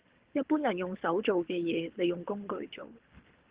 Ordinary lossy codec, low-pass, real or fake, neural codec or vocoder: Opus, 16 kbps; 3.6 kHz; fake; codec, 24 kHz, 6 kbps, HILCodec